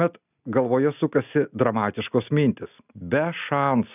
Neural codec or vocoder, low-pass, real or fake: none; 3.6 kHz; real